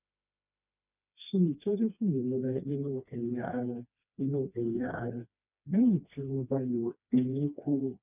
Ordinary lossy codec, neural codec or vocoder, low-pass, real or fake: none; codec, 16 kHz, 2 kbps, FreqCodec, smaller model; 3.6 kHz; fake